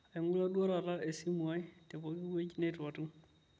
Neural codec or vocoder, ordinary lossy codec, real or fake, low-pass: none; none; real; none